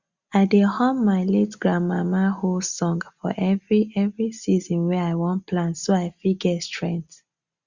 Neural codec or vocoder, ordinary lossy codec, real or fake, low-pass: none; Opus, 64 kbps; real; 7.2 kHz